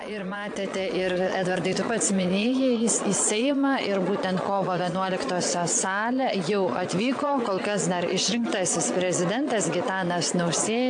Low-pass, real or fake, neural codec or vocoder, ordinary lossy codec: 9.9 kHz; fake; vocoder, 22.05 kHz, 80 mel bands, Vocos; AAC, 64 kbps